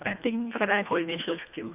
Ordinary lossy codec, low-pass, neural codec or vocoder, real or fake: none; 3.6 kHz; codec, 24 kHz, 1.5 kbps, HILCodec; fake